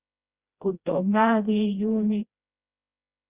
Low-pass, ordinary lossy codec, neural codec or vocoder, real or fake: 3.6 kHz; Opus, 64 kbps; codec, 16 kHz, 1 kbps, FreqCodec, smaller model; fake